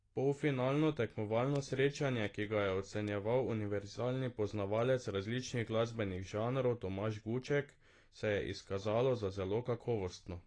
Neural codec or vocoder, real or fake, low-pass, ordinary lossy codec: none; real; 9.9 kHz; AAC, 32 kbps